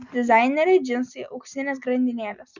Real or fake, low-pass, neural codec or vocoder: real; 7.2 kHz; none